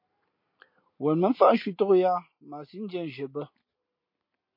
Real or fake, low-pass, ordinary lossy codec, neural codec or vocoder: real; 5.4 kHz; MP3, 32 kbps; none